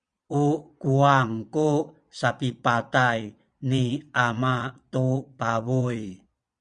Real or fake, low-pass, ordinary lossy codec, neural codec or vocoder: fake; 9.9 kHz; Opus, 64 kbps; vocoder, 22.05 kHz, 80 mel bands, Vocos